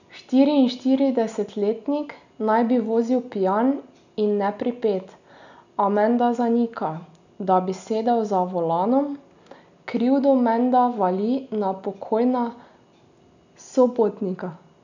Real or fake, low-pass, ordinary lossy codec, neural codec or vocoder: real; 7.2 kHz; none; none